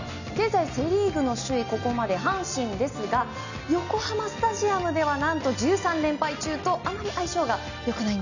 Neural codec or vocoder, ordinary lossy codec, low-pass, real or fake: none; none; 7.2 kHz; real